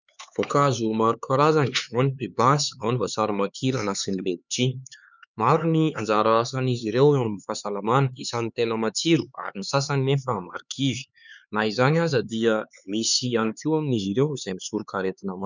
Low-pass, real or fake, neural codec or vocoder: 7.2 kHz; fake; codec, 16 kHz, 4 kbps, X-Codec, HuBERT features, trained on LibriSpeech